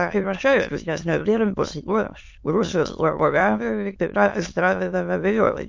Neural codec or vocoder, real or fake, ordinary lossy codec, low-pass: autoencoder, 22.05 kHz, a latent of 192 numbers a frame, VITS, trained on many speakers; fake; MP3, 48 kbps; 7.2 kHz